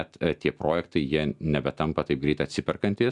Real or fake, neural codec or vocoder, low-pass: real; none; 10.8 kHz